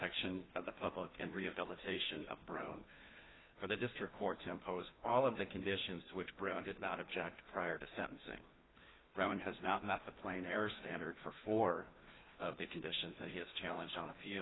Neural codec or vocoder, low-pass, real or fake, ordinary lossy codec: codec, 16 kHz, 2 kbps, FreqCodec, larger model; 7.2 kHz; fake; AAC, 16 kbps